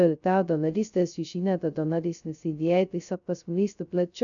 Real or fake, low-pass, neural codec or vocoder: fake; 7.2 kHz; codec, 16 kHz, 0.2 kbps, FocalCodec